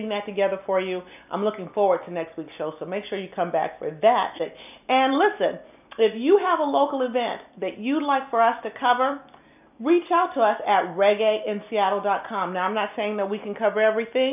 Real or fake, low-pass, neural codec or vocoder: real; 3.6 kHz; none